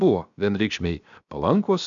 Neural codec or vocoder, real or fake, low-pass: codec, 16 kHz, 0.7 kbps, FocalCodec; fake; 7.2 kHz